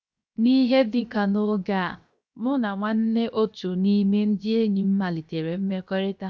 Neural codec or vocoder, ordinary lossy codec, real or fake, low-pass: codec, 16 kHz, 0.7 kbps, FocalCodec; none; fake; none